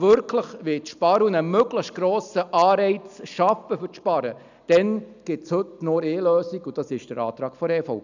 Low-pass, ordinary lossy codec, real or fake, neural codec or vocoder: 7.2 kHz; none; real; none